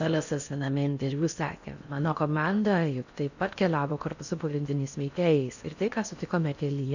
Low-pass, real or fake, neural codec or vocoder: 7.2 kHz; fake; codec, 16 kHz in and 24 kHz out, 0.6 kbps, FocalCodec, streaming, 4096 codes